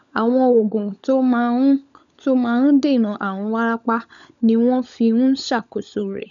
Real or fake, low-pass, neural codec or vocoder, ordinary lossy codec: fake; 7.2 kHz; codec, 16 kHz, 16 kbps, FunCodec, trained on LibriTTS, 50 frames a second; none